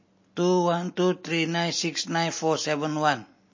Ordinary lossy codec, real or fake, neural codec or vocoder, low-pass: MP3, 32 kbps; real; none; 7.2 kHz